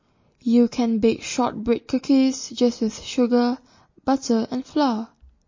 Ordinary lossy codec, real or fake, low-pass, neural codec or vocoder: MP3, 32 kbps; real; 7.2 kHz; none